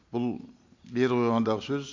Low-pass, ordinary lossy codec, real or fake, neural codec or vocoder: 7.2 kHz; MP3, 48 kbps; real; none